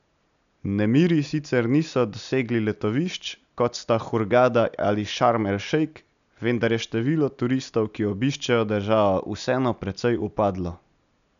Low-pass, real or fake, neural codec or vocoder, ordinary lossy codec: 7.2 kHz; real; none; none